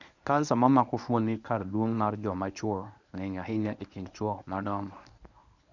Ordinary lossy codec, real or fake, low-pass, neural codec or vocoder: none; fake; 7.2 kHz; codec, 24 kHz, 0.9 kbps, WavTokenizer, medium speech release version 1